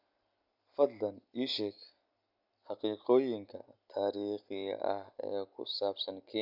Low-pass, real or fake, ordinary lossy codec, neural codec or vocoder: 5.4 kHz; real; none; none